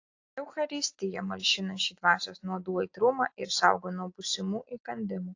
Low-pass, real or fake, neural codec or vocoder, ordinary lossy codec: 7.2 kHz; real; none; AAC, 48 kbps